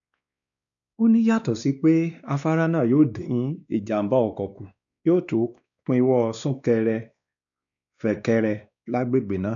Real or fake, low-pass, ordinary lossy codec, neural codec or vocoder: fake; 7.2 kHz; none; codec, 16 kHz, 2 kbps, X-Codec, WavLM features, trained on Multilingual LibriSpeech